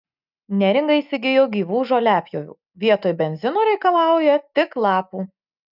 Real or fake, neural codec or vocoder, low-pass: real; none; 5.4 kHz